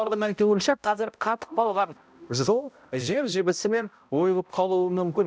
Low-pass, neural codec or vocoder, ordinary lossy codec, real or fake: none; codec, 16 kHz, 0.5 kbps, X-Codec, HuBERT features, trained on balanced general audio; none; fake